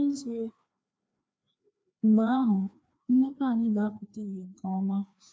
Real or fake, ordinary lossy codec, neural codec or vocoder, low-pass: fake; none; codec, 16 kHz, 2 kbps, FreqCodec, larger model; none